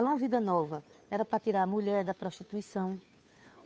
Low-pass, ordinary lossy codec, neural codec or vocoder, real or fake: none; none; codec, 16 kHz, 2 kbps, FunCodec, trained on Chinese and English, 25 frames a second; fake